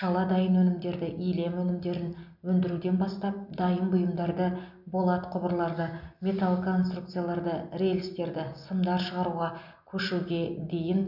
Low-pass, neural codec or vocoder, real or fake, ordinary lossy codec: 5.4 kHz; none; real; none